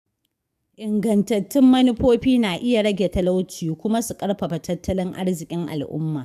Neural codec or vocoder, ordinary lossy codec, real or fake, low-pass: codec, 44.1 kHz, 7.8 kbps, DAC; none; fake; 14.4 kHz